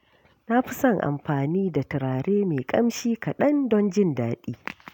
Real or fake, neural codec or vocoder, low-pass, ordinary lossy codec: real; none; 19.8 kHz; none